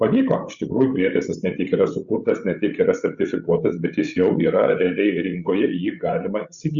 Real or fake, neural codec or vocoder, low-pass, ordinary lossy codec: fake; codec, 16 kHz, 16 kbps, FreqCodec, larger model; 7.2 kHz; Opus, 64 kbps